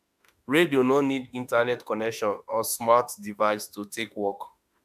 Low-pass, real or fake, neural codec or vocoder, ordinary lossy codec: 14.4 kHz; fake; autoencoder, 48 kHz, 32 numbers a frame, DAC-VAE, trained on Japanese speech; AAC, 96 kbps